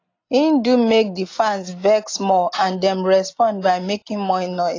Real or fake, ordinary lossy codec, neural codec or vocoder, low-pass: real; AAC, 32 kbps; none; 7.2 kHz